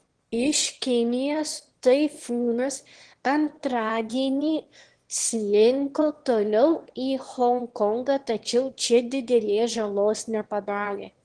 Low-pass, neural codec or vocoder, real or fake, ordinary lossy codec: 9.9 kHz; autoencoder, 22.05 kHz, a latent of 192 numbers a frame, VITS, trained on one speaker; fake; Opus, 16 kbps